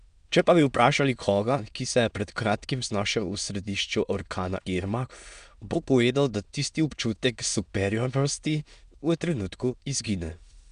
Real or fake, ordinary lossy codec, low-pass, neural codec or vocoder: fake; none; 9.9 kHz; autoencoder, 22.05 kHz, a latent of 192 numbers a frame, VITS, trained on many speakers